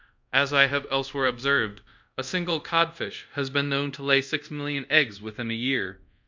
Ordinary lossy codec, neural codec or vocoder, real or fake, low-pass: MP3, 64 kbps; codec, 24 kHz, 0.5 kbps, DualCodec; fake; 7.2 kHz